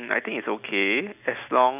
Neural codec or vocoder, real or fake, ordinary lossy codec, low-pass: none; real; none; 3.6 kHz